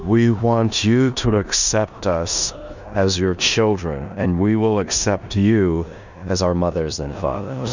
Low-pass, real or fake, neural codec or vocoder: 7.2 kHz; fake; codec, 16 kHz in and 24 kHz out, 0.9 kbps, LongCat-Audio-Codec, four codebook decoder